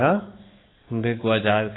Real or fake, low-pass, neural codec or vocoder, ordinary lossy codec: fake; 7.2 kHz; codec, 16 kHz, 4 kbps, X-Codec, HuBERT features, trained on balanced general audio; AAC, 16 kbps